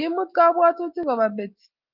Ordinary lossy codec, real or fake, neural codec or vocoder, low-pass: Opus, 24 kbps; real; none; 5.4 kHz